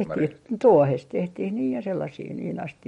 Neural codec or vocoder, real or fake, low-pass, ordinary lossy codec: none; real; 19.8 kHz; MP3, 48 kbps